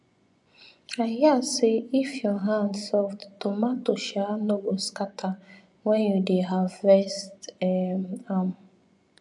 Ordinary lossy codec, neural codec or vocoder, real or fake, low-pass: none; vocoder, 24 kHz, 100 mel bands, Vocos; fake; 10.8 kHz